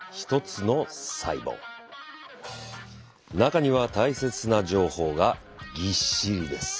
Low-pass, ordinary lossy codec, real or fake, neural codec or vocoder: none; none; real; none